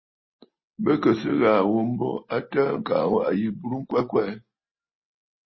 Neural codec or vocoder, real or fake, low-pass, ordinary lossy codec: none; real; 7.2 kHz; MP3, 24 kbps